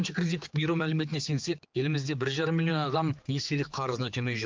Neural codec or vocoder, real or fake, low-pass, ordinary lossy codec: codec, 16 kHz, 4 kbps, X-Codec, HuBERT features, trained on general audio; fake; 7.2 kHz; Opus, 24 kbps